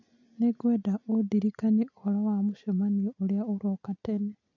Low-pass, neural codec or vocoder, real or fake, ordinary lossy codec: 7.2 kHz; none; real; AAC, 48 kbps